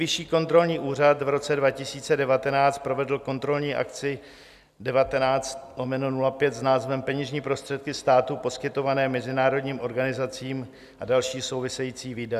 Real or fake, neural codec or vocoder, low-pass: real; none; 14.4 kHz